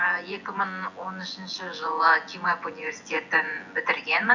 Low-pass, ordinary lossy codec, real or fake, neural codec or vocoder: 7.2 kHz; Opus, 64 kbps; fake; vocoder, 24 kHz, 100 mel bands, Vocos